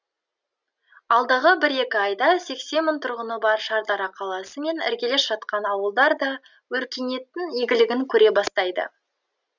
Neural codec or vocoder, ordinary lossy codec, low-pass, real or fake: none; none; 7.2 kHz; real